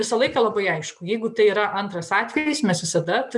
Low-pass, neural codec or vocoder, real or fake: 10.8 kHz; none; real